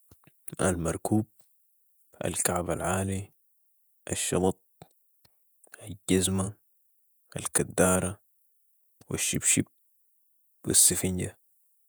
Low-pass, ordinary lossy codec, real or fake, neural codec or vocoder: none; none; fake; vocoder, 48 kHz, 128 mel bands, Vocos